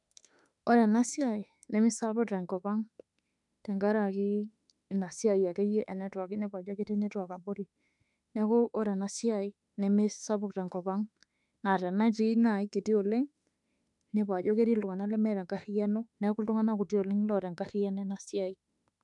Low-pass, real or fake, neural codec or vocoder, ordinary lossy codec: 10.8 kHz; fake; autoencoder, 48 kHz, 32 numbers a frame, DAC-VAE, trained on Japanese speech; none